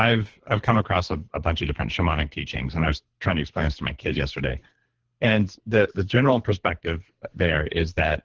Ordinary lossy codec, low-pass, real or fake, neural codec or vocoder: Opus, 16 kbps; 7.2 kHz; fake; codec, 24 kHz, 3 kbps, HILCodec